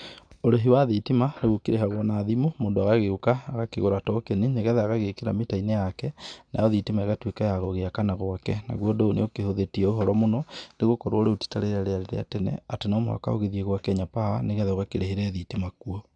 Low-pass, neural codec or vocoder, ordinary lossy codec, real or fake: 9.9 kHz; none; none; real